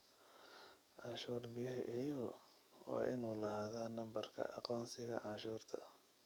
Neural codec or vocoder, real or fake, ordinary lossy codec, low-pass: codec, 44.1 kHz, 7.8 kbps, DAC; fake; none; none